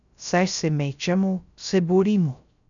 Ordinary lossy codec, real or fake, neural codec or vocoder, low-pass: none; fake; codec, 16 kHz, 0.3 kbps, FocalCodec; 7.2 kHz